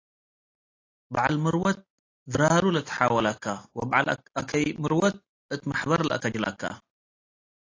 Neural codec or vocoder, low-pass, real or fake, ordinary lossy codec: none; 7.2 kHz; real; AAC, 32 kbps